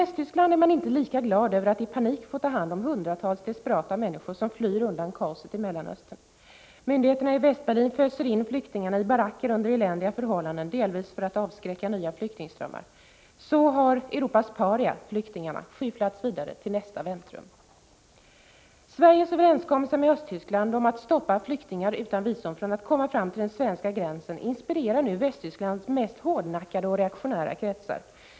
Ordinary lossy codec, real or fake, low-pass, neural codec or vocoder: none; real; none; none